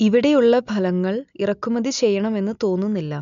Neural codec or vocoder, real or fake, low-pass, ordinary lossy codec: none; real; 7.2 kHz; none